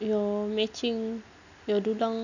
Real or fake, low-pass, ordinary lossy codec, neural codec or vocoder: real; 7.2 kHz; none; none